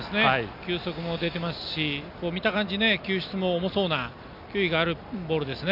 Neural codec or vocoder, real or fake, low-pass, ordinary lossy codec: none; real; 5.4 kHz; none